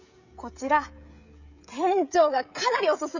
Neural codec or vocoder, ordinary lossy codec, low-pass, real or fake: codec, 16 kHz, 16 kbps, FreqCodec, smaller model; none; 7.2 kHz; fake